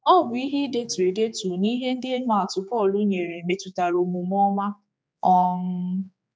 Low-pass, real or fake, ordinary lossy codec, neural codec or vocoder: none; fake; none; codec, 16 kHz, 4 kbps, X-Codec, HuBERT features, trained on general audio